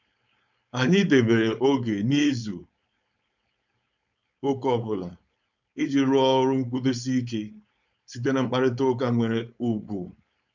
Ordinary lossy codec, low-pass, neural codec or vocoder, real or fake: none; 7.2 kHz; codec, 16 kHz, 4.8 kbps, FACodec; fake